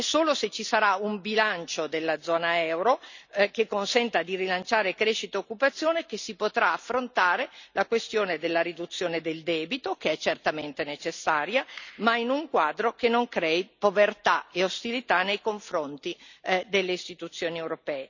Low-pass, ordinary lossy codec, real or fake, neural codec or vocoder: 7.2 kHz; none; real; none